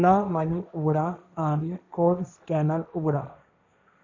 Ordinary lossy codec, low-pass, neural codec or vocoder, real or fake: none; 7.2 kHz; codec, 16 kHz, 1.1 kbps, Voila-Tokenizer; fake